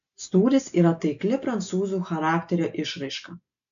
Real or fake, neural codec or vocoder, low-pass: real; none; 7.2 kHz